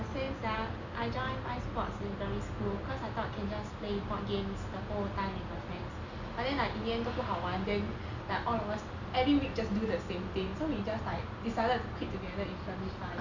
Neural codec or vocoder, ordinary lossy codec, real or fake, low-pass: none; none; real; 7.2 kHz